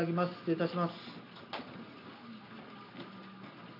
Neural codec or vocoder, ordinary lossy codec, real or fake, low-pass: none; none; real; 5.4 kHz